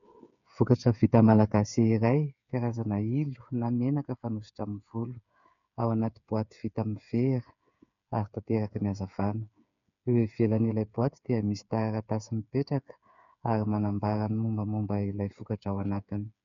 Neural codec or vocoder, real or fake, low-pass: codec, 16 kHz, 8 kbps, FreqCodec, smaller model; fake; 7.2 kHz